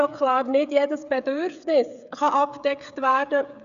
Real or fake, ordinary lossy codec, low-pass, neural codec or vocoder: fake; none; 7.2 kHz; codec, 16 kHz, 8 kbps, FreqCodec, smaller model